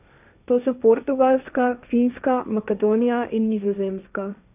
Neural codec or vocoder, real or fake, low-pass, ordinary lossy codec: codec, 16 kHz, 1.1 kbps, Voila-Tokenizer; fake; 3.6 kHz; none